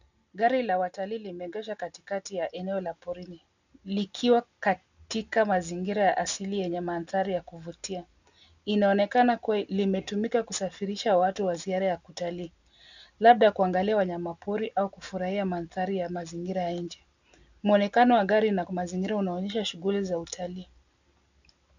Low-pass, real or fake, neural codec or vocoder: 7.2 kHz; real; none